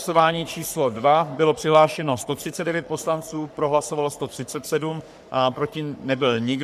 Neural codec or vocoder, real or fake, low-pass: codec, 44.1 kHz, 3.4 kbps, Pupu-Codec; fake; 14.4 kHz